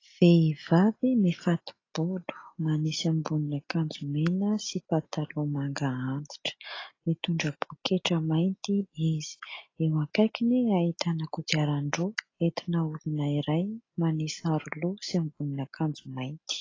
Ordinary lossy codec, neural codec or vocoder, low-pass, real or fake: AAC, 32 kbps; none; 7.2 kHz; real